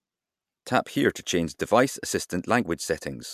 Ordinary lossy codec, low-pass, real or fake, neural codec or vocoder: MP3, 96 kbps; 14.4 kHz; real; none